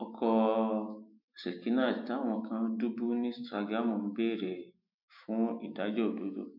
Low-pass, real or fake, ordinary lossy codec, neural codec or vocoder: 5.4 kHz; fake; none; autoencoder, 48 kHz, 128 numbers a frame, DAC-VAE, trained on Japanese speech